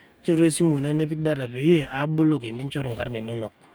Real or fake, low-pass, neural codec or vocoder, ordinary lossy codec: fake; none; codec, 44.1 kHz, 2.6 kbps, DAC; none